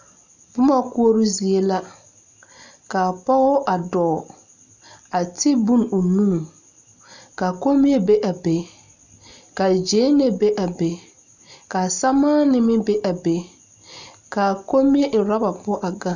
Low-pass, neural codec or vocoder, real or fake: 7.2 kHz; none; real